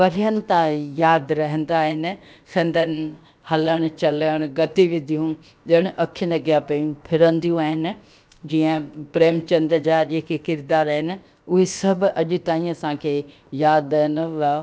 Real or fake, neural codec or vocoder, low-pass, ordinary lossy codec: fake; codec, 16 kHz, about 1 kbps, DyCAST, with the encoder's durations; none; none